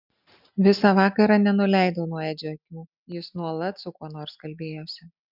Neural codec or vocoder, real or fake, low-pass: none; real; 5.4 kHz